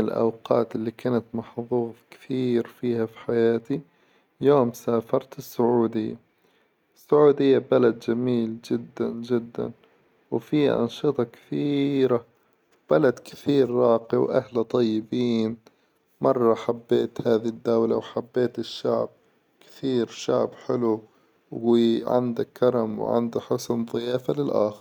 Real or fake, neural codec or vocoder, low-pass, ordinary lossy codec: real; none; 19.8 kHz; none